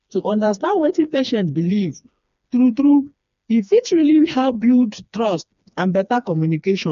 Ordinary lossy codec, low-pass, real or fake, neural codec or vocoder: none; 7.2 kHz; fake; codec, 16 kHz, 2 kbps, FreqCodec, smaller model